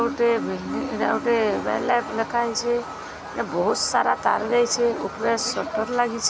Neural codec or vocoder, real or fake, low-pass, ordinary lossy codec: none; real; none; none